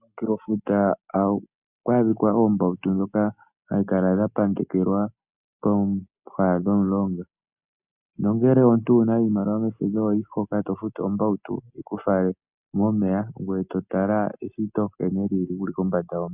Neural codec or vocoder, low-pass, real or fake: none; 3.6 kHz; real